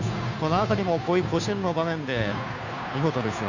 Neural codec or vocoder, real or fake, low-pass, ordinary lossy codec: codec, 16 kHz, 2 kbps, FunCodec, trained on Chinese and English, 25 frames a second; fake; 7.2 kHz; none